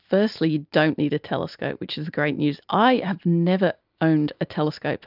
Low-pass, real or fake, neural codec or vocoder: 5.4 kHz; real; none